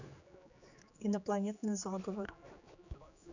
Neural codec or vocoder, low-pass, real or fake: codec, 16 kHz, 4 kbps, X-Codec, HuBERT features, trained on general audio; 7.2 kHz; fake